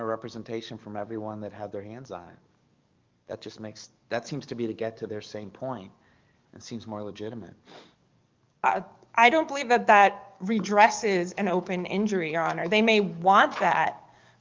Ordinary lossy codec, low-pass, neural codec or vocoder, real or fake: Opus, 24 kbps; 7.2 kHz; codec, 44.1 kHz, 7.8 kbps, DAC; fake